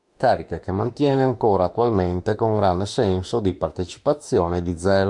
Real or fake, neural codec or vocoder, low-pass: fake; autoencoder, 48 kHz, 32 numbers a frame, DAC-VAE, trained on Japanese speech; 10.8 kHz